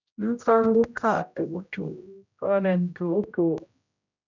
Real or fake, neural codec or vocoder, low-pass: fake; codec, 16 kHz, 0.5 kbps, X-Codec, HuBERT features, trained on general audio; 7.2 kHz